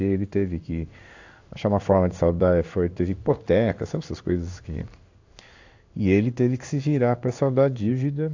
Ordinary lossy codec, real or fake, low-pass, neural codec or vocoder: AAC, 48 kbps; fake; 7.2 kHz; codec, 16 kHz in and 24 kHz out, 1 kbps, XY-Tokenizer